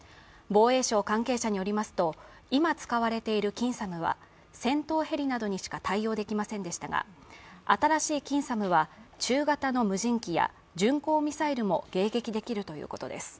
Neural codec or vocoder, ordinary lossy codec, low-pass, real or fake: none; none; none; real